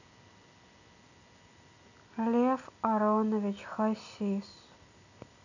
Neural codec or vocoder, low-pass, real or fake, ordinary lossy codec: none; 7.2 kHz; real; none